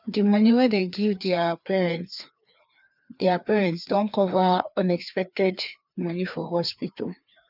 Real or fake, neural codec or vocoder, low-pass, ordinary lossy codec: fake; codec, 16 kHz, 2 kbps, FreqCodec, larger model; 5.4 kHz; none